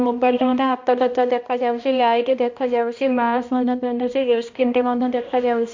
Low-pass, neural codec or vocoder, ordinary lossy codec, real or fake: 7.2 kHz; codec, 16 kHz, 1 kbps, X-Codec, HuBERT features, trained on balanced general audio; MP3, 48 kbps; fake